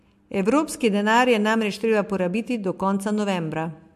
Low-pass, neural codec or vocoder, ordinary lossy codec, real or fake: 14.4 kHz; none; MP3, 64 kbps; real